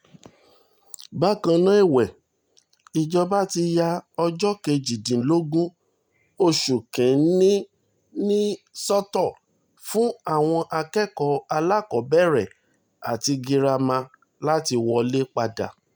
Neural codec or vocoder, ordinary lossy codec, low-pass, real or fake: none; none; none; real